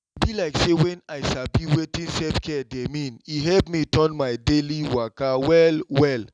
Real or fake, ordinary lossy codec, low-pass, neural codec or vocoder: real; none; 9.9 kHz; none